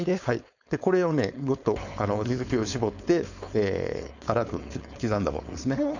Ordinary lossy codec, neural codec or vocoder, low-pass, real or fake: none; codec, 16 kHz, 4.8 kbps, FACodec; 7.2 kHz; fake